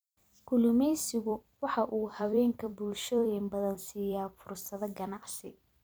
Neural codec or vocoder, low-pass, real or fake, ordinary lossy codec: vocoder, 44.1 kHz, 128 mel bands every 256 samples, BigVGAN v2; none; fake; none